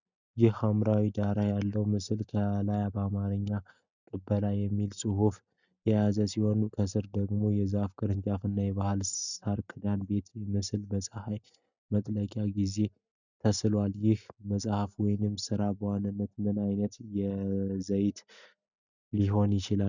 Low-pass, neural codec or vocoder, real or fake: 7.2 kHz; none; real